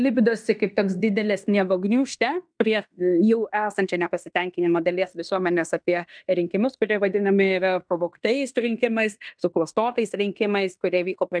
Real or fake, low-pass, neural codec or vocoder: fake; 9.9 kHz; codec, 16 kHz in and 24 kHz out, 0.9 kbps, LongCat-Audio-Codec, fine tuned four codebook decoder